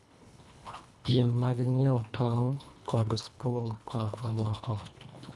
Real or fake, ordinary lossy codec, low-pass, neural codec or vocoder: fake; none; none; codec, 24 kHz, 1.5 kbps, HILCodec